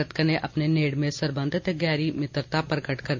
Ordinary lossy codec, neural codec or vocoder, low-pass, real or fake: MP3, 64 kbps; none; 7.2 kHz; real